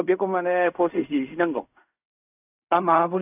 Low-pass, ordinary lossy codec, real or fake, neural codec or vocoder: 3.6 kHz; none; fake; codec, 16 kHz in and 24 kHz out, 0.4 kbps, LongCat-Audio-Codec, fine tuned four codebook decoder